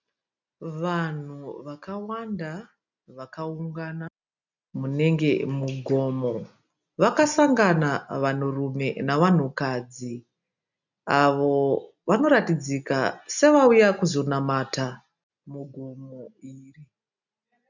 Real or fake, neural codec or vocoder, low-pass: real; none; 7.2 kHz